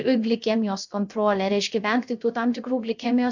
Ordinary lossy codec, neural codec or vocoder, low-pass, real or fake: MP3, 64 kbps; codec, 16 kHz, 0.3 kbps, FocalCodec; 7.2 kHz; fake